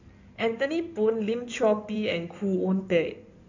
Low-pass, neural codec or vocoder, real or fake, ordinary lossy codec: 7.2 kHz; codec, 16 kHz in and 24 kHz out, 2.2 kbps, FireRedTTS-2 codec; fake; none